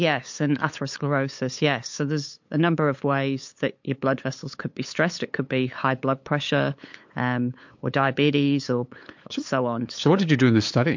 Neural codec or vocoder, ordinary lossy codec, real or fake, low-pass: codec, 16 kHz, 8 kbps, FunCodec, trained on LibriTTS, 25 frames a second; MP3, 48 kbps; fake; 7.2 kHz